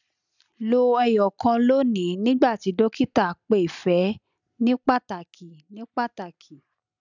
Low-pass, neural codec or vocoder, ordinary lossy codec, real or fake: 7.2 kHz; none; none; real